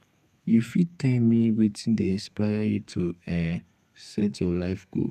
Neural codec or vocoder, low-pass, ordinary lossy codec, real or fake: codec, 32 kHz, 1.9 kbps, SNAC; 14.4 kHz; none; fake